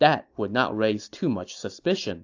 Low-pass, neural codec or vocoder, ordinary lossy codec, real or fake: 7.2 kHz; none; AAC, 48 kbps; real